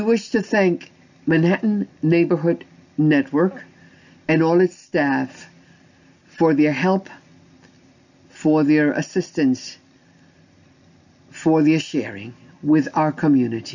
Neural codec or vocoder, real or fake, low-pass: none; real; 7.2 kHz